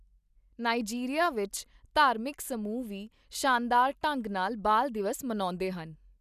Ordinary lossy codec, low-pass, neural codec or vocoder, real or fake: none; 14.4 kHz; none; real